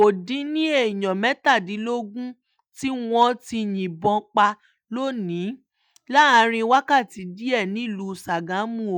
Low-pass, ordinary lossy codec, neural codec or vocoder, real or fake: 19.8 kHz; none; none; real